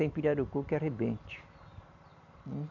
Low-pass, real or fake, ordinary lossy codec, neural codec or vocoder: 7.2 kHz; real; none; none